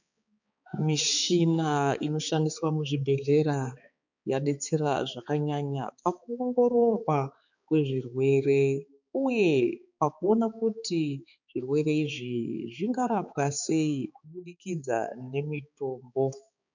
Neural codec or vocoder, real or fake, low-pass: codec, 16 kHz, 4 kbps, X-Codec, HuBERT features, trained on balanced general audio; fake; 7.2 kHz